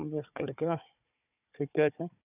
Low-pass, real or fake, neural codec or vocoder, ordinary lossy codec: 3.6 kHz; fake; codec, 16 kHz, 4 kbps, FunCodec, trained on Chinese and English, 50 frames a second; none